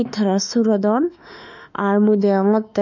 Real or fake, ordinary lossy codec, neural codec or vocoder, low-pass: fake; none; autoencoder, 48 kHz, 32 numbers a frame, DAC-VAE, trained on Japanese speech; 7.2 kHz